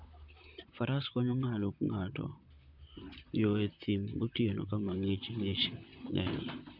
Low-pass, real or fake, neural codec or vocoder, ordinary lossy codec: 5.4 kHz; fake; vocoder, 44.1 kHz, 128 mel bands, Pupu-Vocoder; none